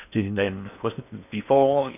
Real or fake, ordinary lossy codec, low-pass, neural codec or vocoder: fake; none; 3.6 kHz; codec, 16 kHz in and 24 kHz out, 0.8 kbps, FocalCodec, streaming, 65536 codes